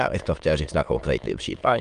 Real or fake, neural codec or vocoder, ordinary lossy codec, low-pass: fake; autoencoder, 22.05 kHz, a latent of 192 numbers a frame, VITS, trained on many speakers; Opus, 32 kbps; 9.9 kHz